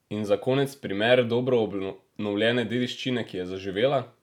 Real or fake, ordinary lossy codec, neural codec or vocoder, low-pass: real; none; none; 19.8 kHz